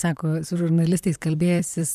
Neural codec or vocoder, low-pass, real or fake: vocoder, 44.1 kHz, 128 mel bands, Pupu-Vocoder; 14.4 kHz; fake